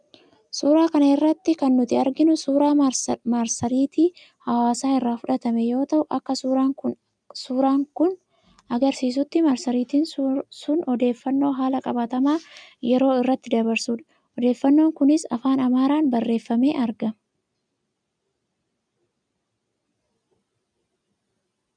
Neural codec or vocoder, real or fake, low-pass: none; real; 9.9 kHz